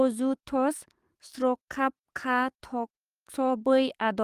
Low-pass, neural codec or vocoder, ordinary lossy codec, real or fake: 14.4 kHz; codec, 44.1 kHz, 7.8 kbps, DAC; Opus, 32 kbps; fake